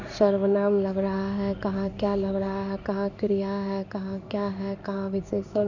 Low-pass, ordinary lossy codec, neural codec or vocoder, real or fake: 7.2 kHz; none; codec, 16 kHz in and 24 kHz out, 1 kbps, XY-Tokenizer; fake